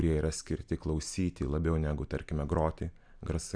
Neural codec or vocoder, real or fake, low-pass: none; real; 9.9 kHz